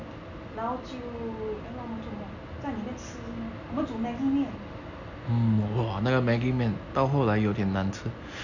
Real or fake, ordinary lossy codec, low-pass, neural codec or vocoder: real; none; 7.2 kHz; none